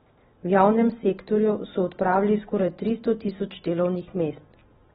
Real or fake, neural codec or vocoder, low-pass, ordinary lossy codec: real; none; 19.8 kHz; AAC, 16 kbps